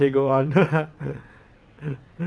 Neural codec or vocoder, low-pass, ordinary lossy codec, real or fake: vocoder, 22.05 kHz, 80 mel bands, WaveNeXt; none; none; fake